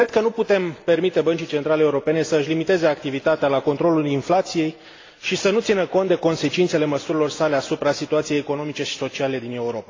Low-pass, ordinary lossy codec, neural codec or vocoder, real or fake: 7.2 kHz; AAC, 32 kbps; none; real